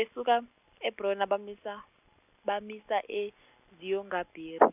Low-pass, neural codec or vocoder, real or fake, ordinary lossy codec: 3.6 kHz; none; real; none